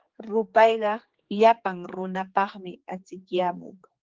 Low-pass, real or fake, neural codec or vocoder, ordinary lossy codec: 7.2 kHz; fake; codec, 16 kHz, 4 kbps, X-Codec, HuBERT features, trained on general audio; Opus, 16 kbps